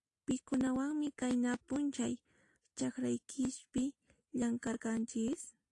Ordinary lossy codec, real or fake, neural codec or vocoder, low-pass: AAC, 48 kbps; real; none; 10.8 kHz